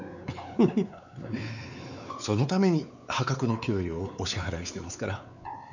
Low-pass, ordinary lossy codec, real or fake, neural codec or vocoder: 7.2 kHz; none; fake; codec, 16 kHz, 4 kbps, X-Codec, WavLM features, trained on Multilingual LibriSpeech